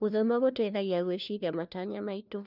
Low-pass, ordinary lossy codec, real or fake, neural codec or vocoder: 5.4 kHz; none; fake; codec, 16 kHz, 1 kbps, FunCodec, trained on LibriTTS, 50 frames a second